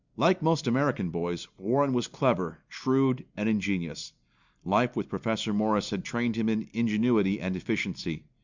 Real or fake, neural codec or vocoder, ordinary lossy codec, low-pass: real; none; Opus, 64 kbps; 7.2 kHz